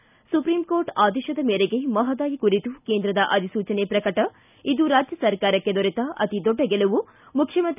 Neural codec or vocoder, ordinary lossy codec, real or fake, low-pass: none; none; real; 3.6 kHz